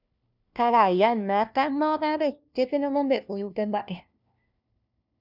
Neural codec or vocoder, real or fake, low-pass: codec, 16 kHz, 1 kbps, FunCodec, trained on LibriTTS, 50 frames a second; fake; 5.4 kHz